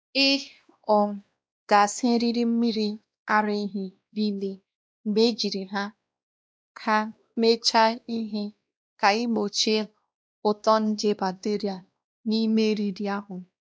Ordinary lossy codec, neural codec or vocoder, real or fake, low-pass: none; codec, 16 kHz, 1 kbps, X-Codec, WavLM features, trained on Multilingual LibriSpeech; fake; none